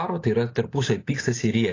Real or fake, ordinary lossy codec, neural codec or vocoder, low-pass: real; AAC, 32 kbps; none; 7.2 kHz